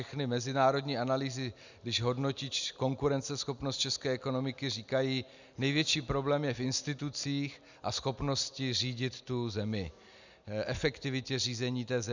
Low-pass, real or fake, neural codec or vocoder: 7.2 kHz; real; none